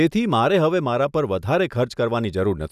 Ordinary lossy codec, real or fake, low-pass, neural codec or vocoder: none; real; 14.4 kHz; none